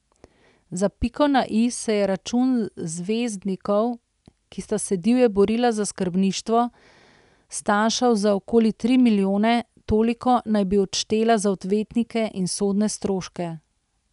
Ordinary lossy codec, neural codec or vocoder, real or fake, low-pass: none; none; real; 10.8 kHz